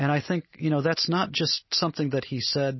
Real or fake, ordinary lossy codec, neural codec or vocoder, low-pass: real; MP3, 24 kbps; none; 7.2 kHz